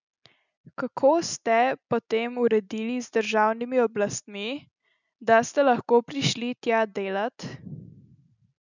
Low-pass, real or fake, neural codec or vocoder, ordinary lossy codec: 7.2 kHz; real; none; none